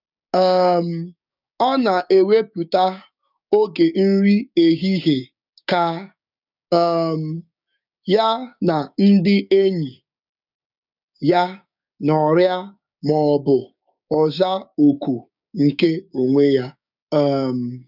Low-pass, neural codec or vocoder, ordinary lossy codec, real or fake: 5.4 kHz; codec, 16 kHz, 6 kbps, DAC; none; fake